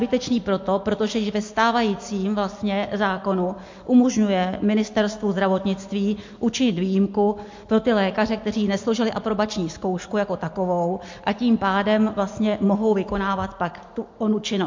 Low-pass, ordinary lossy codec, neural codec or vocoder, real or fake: 7.2 kHz; MP3, 48 kbps; none; real